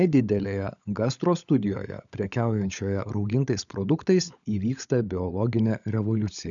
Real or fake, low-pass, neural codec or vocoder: fake; 7.2 kHz; codec, 16 kHz, 16 kbps, FunCodec, trained on LibriTTS, 50 frames a second